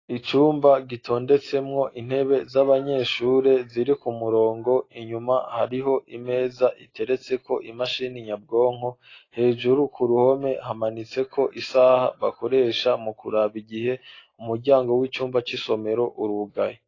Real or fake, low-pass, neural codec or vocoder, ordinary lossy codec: real; 7.2 kHz; none; AAC, 32 kbps